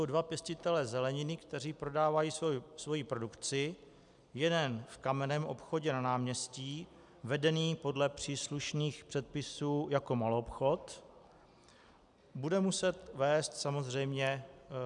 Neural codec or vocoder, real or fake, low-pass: none; real; 10.8 kHz